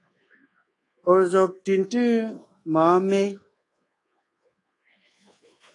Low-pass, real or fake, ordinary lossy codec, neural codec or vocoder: 10.8 kHz; fake; AAC, 32 kbps; codec, 24 kHz, 1.2 kbps, DualCodec